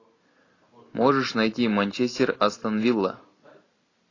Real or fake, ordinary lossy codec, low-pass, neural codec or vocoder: real; AAC, 32 kbps; 7.2 kHz; none